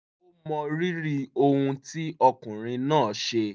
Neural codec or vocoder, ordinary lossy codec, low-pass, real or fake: none; none; none; real